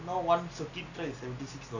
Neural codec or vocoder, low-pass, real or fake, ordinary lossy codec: none; 7.2 kHz; real; none